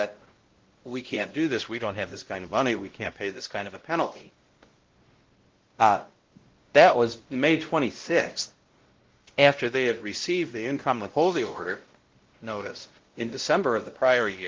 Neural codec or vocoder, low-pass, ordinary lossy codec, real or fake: codec, 16 kHz, 0.5 kbps, X-Codec, WavLM features, trained on Multilingual LibriSpeech; 7.2 kHz; Opus, 16 kbps; fake